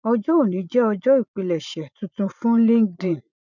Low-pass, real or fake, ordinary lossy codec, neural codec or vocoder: 7.2 kHz; fake; none; vocoder, 44.1 kHz, 80 mel bands, Vocos